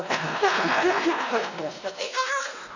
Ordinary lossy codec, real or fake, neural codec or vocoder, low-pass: none; fake; codec, 16 kHz, 1 kbps, X-Codec, WavLM features, trained on Multilingual LibriSpeech; 7.2 kHz